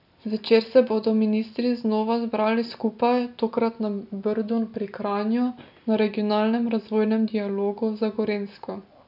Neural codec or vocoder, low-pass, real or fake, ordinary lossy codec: none; 5.4 kHz; real; none